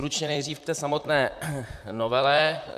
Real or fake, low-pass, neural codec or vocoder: fake; 14.4 kHz; vocoder, 44.1 kHz, 128 mel bands, Pupu-Vocoder